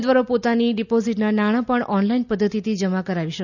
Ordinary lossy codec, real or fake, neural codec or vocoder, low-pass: none; real; none; 7.2 kHz